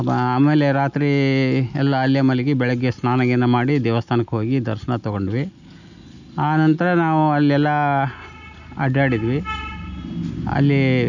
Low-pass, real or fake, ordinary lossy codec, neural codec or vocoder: 7.2 kHz; real; none; none